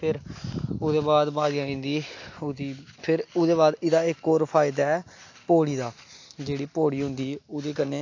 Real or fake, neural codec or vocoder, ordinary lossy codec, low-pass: real; none; AAC, 48 kbps; 7.2 kHz